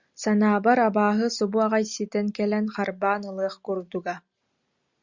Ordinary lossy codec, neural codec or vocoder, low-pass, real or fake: Opus, 64 kbps; none; 7.2 kHz; real